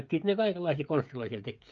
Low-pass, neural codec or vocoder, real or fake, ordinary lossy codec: 7.2 kHz; codec, 16 kHz, 16 kbps, FreqCodec, smaller model; fake; Opus, 24 kbps